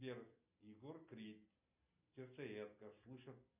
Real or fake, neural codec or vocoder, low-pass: real; none; 3.6 kHz